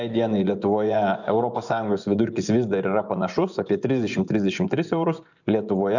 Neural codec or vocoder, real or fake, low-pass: vocoder, 24 kHz, 100 mel bands, Vocos; fake; 7.2 kHz